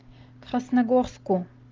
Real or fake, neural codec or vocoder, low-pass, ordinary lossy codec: real; none; 7.2 kHz; Opus, 32 kbps